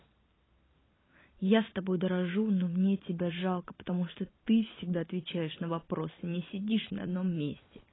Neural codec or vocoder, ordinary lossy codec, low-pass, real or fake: vocoder, 44.1 kHz, 128 mel bands every 256 samples, BigVGAN v2; AAC, 16 kbps; 7.2 kHz; fake